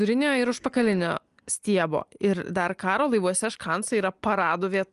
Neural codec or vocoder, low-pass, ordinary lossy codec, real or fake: none; 10.8 kHz; Opus, 32 kbps; real